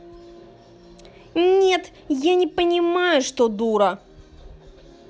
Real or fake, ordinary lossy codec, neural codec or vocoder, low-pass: real; none; none; none